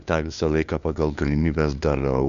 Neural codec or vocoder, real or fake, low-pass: codec, 16 kHz, 2 kbps, FunCodec, trained on LibriTTS, 25 frames a second; fake; 7.2 kHz